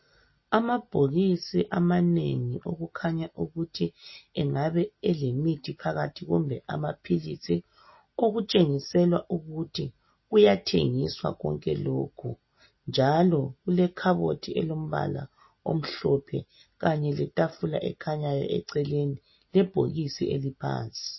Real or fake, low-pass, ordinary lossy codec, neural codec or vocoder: real; 7.2 kHz; MP3, 24 kbps; none